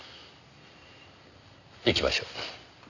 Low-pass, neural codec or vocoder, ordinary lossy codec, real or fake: 7.2 kHz; none; none; real